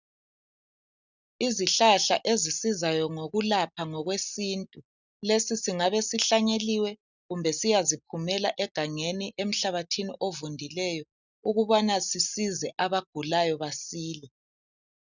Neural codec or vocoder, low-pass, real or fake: none; 7.2 kHz; real